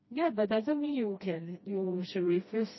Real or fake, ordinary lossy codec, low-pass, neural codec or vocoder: fake; MP3, 24 kbps; 7.2 kHz; codec, 16 kHz, 1 kbps, FreqCodec, smaller model